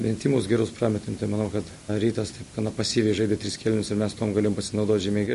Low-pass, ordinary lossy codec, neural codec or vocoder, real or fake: 14.4 kHz; MP3, 48 kbps; none; real